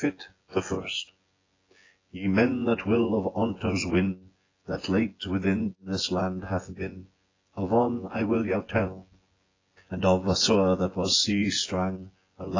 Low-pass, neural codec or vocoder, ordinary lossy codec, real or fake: 7.2 kHz; vocoder, 24 kHz, 100 mel bands, Vocos; AAC, 32 kbps; fake